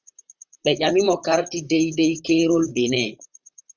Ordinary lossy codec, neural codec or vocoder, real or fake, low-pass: Opus, 64 kbps; vocoder, 44.1 kHz, 128 mel bands, Pupu-Vocoder; fake; 7.2 kHz